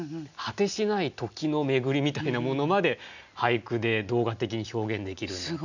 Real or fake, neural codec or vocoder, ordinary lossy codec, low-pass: real; none; none; 7.2 kHz